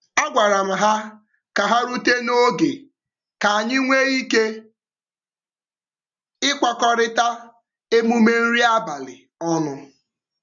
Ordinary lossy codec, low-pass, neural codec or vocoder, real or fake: none; 7.2 kHz; none; real